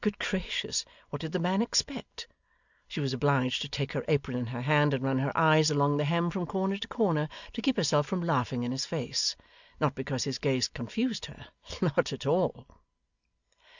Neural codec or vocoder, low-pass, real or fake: none; 7.2 kHz; real